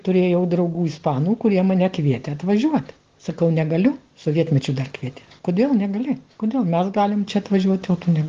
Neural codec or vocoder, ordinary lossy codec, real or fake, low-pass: none; Opus, 16 kbps; real; 7.2 kHz